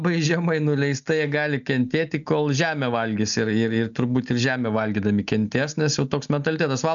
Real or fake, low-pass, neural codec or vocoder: real; 7.2 kHz; none